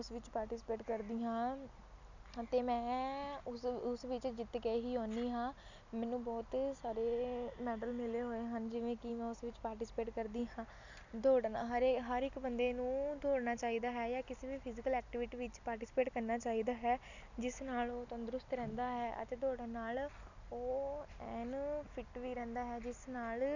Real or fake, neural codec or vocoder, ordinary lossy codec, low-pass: real; none; none; 7.2 kHz